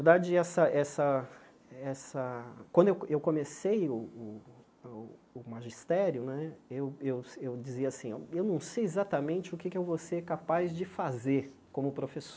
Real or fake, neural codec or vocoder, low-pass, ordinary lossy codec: real; none; none; none